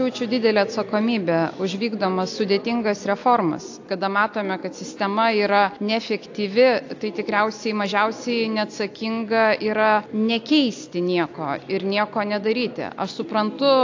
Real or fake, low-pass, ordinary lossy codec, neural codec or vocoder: real; 7.2 kHz; AAC, 48 kbps; none